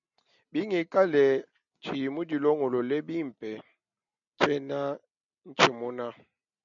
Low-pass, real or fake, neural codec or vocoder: 7.2 kHz; real; none